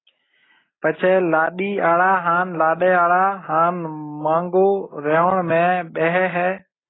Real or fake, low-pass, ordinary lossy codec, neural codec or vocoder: real; 7.2 kHz; AAC, 16 kbps; none